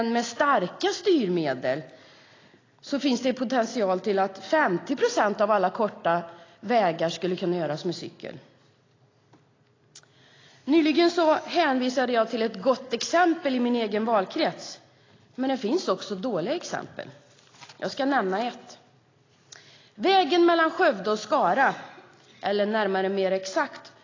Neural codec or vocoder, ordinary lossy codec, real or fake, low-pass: none; AAC, 32 kbps; real; 7.2 kHz